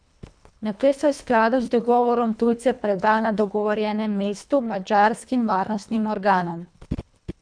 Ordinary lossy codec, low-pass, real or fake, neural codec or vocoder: none; 9.9 kHz; fake; codec, 24 kHz, 1.5 kbps, HILCodec